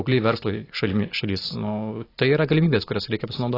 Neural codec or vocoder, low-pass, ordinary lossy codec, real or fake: none; 5.4 kHz; AAC, 24 kbps; real